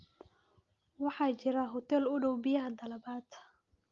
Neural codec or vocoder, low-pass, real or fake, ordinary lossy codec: none; 7.2 kHz; real; Opus, 32 kbps